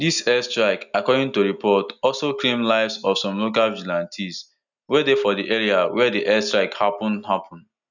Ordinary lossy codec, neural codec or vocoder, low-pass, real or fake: none; none; 7.2 kHz; real